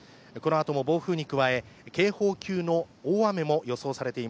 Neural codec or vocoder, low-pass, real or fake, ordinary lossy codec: none; none; real; none